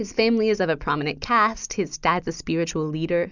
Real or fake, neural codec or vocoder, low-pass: real; none; 7.2 kHz